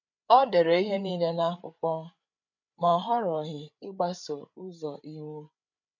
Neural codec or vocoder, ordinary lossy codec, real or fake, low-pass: codec, 16 kHz, 8 kbps, FreqCodec, larger model; none; fake; 7.2 kHz